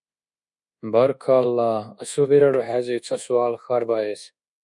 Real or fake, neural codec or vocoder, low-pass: fake; codec, 24 kHz, 1.2 kbps, DualCodec; 10.8 kHz